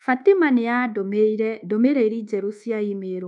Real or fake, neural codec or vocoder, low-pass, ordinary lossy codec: fake; codec, 24 kHz, 1.2 kbps, DualCodec; 10.8 kHz; none